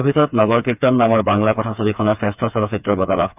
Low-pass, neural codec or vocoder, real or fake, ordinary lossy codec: 3.6 kHz; codec, 16 kHz, 4 kbps, FreqCodec, smaller model; fake; none